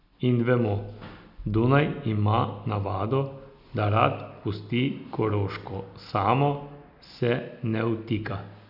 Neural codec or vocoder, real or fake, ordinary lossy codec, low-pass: none; real; none; 5.4 kHz